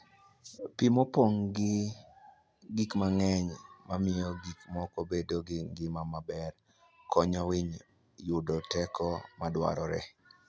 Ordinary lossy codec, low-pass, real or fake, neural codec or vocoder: none; none; real; none